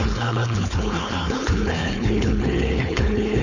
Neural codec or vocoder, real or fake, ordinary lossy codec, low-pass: codec, 16 kHz, 4.8 kbps, FACodec; fake; none; 7.2 kHz